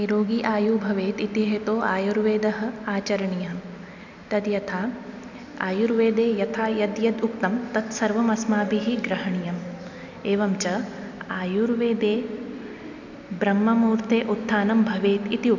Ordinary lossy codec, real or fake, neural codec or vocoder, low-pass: none; real; none; 7.2 kHz